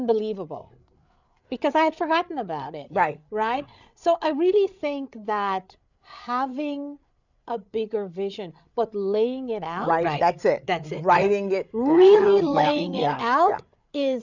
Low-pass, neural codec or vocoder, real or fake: 7.2 kHz; codec, 16 kHz, 8 kbps, FreqCodec, larger model; fake